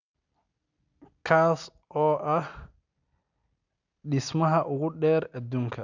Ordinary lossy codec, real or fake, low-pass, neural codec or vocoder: none; real; 7.2 kHz; none